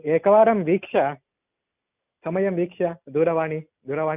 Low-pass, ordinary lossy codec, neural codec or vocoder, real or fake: 3.6 kHz; none; none; real